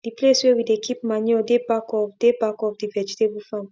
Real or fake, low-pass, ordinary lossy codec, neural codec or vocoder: real; none; none; none